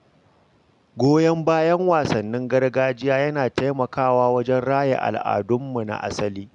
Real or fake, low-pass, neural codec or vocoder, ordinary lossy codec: real; 10.8 kHz; none; none